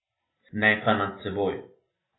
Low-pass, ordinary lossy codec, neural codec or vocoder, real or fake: 7.2 kHz; AAC, 16 kbps; none; real